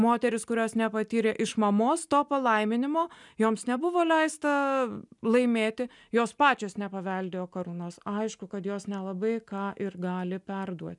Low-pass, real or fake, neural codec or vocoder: 10.8 kHz; real; none